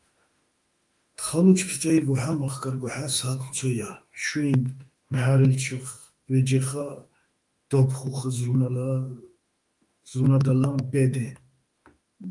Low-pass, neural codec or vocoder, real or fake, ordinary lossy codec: 10.8 kHz; autoencoder, 48 kHz, 32 numbers a frame, DAC-VAE, trained on Japanese speech; fake; Opus, 32 kbps